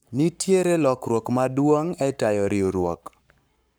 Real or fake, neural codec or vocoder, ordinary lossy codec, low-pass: fake; codec, 44.1 kHz, 7.8 kbps, Pupu-Codec; none; none